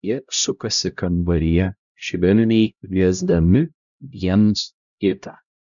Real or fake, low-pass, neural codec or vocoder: fake; 7.2 kHz; codec, 16 kHz, 0.5 kbps, X-Codec, HuBERT features, trained on LibriSpeech